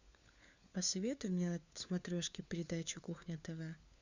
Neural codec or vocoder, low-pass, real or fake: codec, 16 kHz, 4 kbps, FunCodec, trained on LibriTTS, 50 frames a second; 7.2 kHz; fake